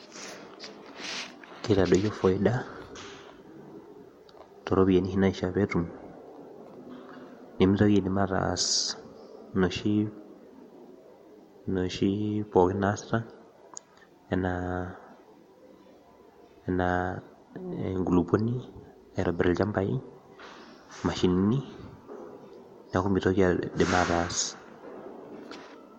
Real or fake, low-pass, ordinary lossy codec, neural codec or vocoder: real; 19.8 kHz; MP3, 64 kbps; none